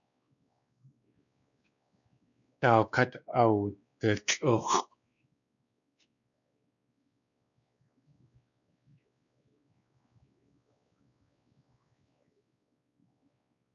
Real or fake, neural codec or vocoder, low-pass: fake; codec, 16 kHz, 2 kbps, X-Codec, WavLM features, trained on Multilingual LibriSpeech; 7.2 kHz